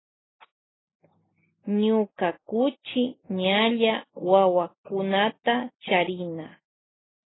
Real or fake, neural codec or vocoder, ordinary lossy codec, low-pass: real; none; AAC, 16 kbps; 7.2 kHz